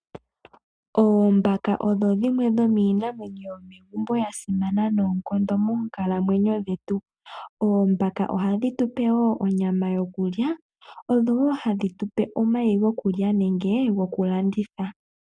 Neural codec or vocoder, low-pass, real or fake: none; 9.9 kHz; real